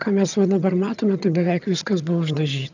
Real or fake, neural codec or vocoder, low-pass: fake; vocoder, 22.05 kHz, 80 mel bands, HiFi-GAN; 7.2 kHz